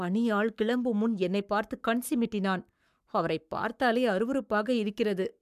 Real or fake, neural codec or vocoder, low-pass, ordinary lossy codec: fake; codec, 44.1 kHz, 7.8 kbps, Pupu-Codec; 14.4 kHz; MP3, 96 kbps